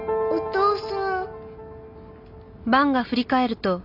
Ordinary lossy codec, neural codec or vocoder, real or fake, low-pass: AAC, 48 kbps; none; real; 5.4 kHz